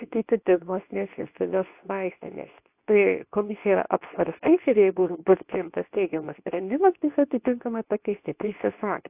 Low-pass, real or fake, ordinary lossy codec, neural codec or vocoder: 3.6 kHz; fake; AAC, 32 kbps; codec, 24 kHz, 0.9 kbps, WavTokenizer, medium speech release version 2